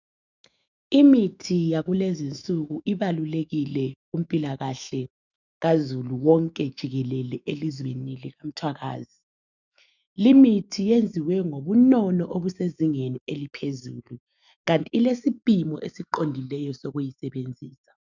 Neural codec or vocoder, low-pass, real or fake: autoencoder, 48 kHz, 128 numbers a frame, DAC-VAE, trained on Japanese speech; 7.2 kHz; fake